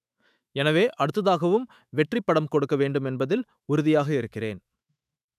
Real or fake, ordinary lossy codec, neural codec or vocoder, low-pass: fake; none; autoencoder, 48 kHz, 128 numbers a frame, DAC-VAE, trained on Japanese speech; 14.4 kHz